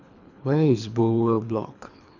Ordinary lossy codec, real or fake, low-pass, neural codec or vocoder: none; fake; 7.2 kHz; codec, 24 kHz, 3 kbps, HILCodec